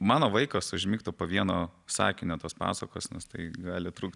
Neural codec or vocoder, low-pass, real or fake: none; 10.8 kHz; real